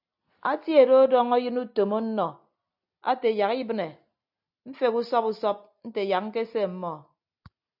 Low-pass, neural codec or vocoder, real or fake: 5.4 kHz; none; real